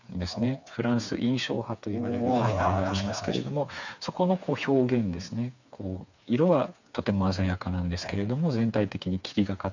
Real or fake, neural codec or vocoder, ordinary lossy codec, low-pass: fake; codec, 16 kHz, 4 kbps, FreqCodec, smaller model; none; 7.2 kHz